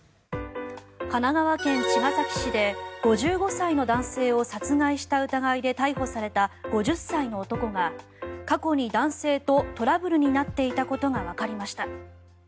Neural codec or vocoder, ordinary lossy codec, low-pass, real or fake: none; none; none; real